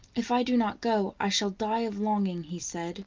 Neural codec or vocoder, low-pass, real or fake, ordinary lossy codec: none; 7.2 kHz; real; Opus, 32 kbps